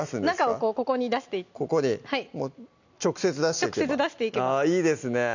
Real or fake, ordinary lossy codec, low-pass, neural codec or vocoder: real; none; 7.2 kHz; none